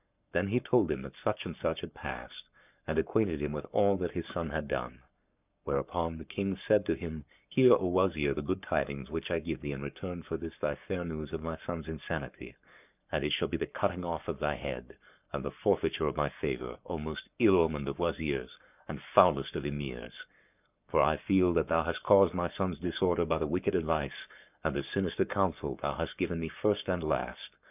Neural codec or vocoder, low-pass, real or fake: codec, 44.1 kHz, 7.8 kbps, Pupu-Codec; 3.6 kHz; fake